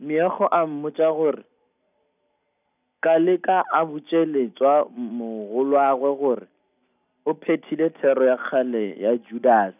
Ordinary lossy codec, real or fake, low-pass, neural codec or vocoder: none; real; 3.6 kHz; none